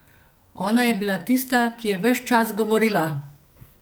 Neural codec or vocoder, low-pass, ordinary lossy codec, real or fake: codec, 44.1 kHz, 2.6 kbps, SNAC; none; none; fake